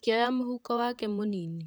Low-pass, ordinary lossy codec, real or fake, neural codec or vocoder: none; none; fake; vocoder, 44.1 kHz, 128 mel bands, Pupu-Vocoder